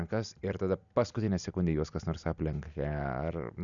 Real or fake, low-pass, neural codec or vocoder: real; 7.2 kHz; none